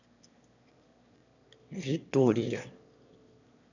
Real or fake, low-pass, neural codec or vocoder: fake; 7.2 kHz; autoencoder, 22.05 kHz, a latent of 192 numbers a frame, VITS, trained on one speaker